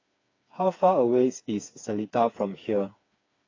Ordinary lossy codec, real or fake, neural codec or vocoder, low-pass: AAC, 32 kbps; fake; codec, 16 kHz, 4 kbps, FreqCodec, smaller model; 7.2 kHz